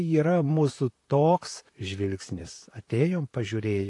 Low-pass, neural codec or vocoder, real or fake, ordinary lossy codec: 10.8 kHz; vocoder, 44.1 kHz, 128 mel bands, Pupu-Vocoder; fake; AAC, 48 kbps